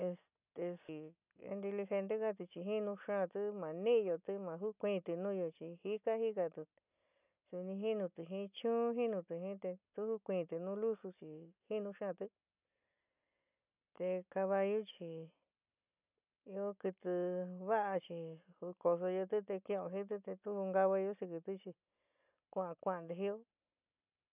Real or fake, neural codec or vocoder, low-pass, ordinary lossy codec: real; none; 3.6 kHz; none